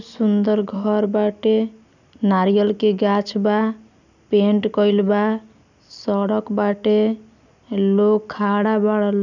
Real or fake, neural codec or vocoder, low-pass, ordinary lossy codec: real; none; 7.2 kHz; none